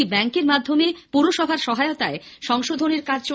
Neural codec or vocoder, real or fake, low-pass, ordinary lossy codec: none; real; none; none